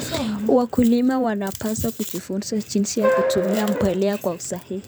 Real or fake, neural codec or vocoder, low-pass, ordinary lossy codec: fake; vocoder, 44.1 kHz, 128 mel bands every 512 samples, BigVGAN v2; none; none